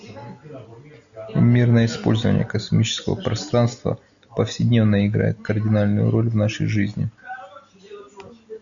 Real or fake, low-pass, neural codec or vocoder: real; 7.2 kHz; none